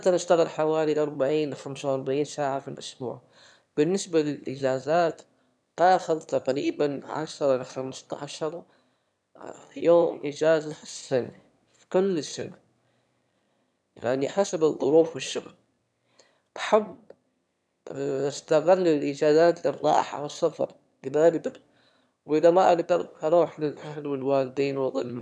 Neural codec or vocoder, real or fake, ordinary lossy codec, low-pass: autoencoder, 22.05 kHz, a latent of 192 numbers a frame, VITS, trained on one speaker; fake; none; none